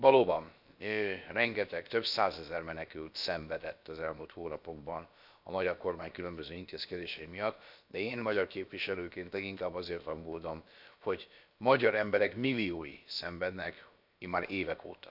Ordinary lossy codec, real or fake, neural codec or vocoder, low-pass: none; fake; codec, 16 kHz, about 1 kbps, DyCAST, with the encoder's durations; 5.4 kHz